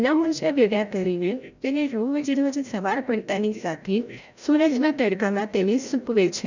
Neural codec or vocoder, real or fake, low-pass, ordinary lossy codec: codec, 16 kHz, 0.5 kbps, FreqCodec, larger model; fake; 7.2 kHz; none